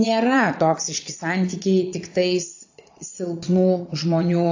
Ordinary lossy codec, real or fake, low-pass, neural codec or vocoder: AAC, 48 kbps; fake; 7.2 kHz; vocoder, 22.05 kHz, 80 mel bands, Vocos